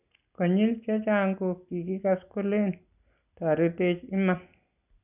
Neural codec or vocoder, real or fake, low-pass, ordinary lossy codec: none; real; 3.6 kHz; none